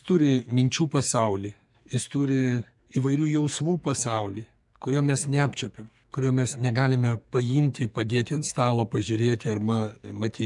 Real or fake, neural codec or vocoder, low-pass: fake; codec, 44.1 kHz, 2.6 kbps, SNAC; 10.8 kHz